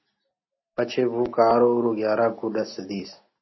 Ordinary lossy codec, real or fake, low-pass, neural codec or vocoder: MP3, 24 kbps; real; 7.2 kHz; none